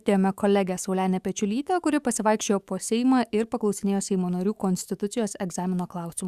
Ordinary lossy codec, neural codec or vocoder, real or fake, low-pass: Opus, 64 kbps; autoencoder, 48 kHz, 128 numbers a frame, DAC-VAE, trained on Japanese speech; fake; 14.4 kHz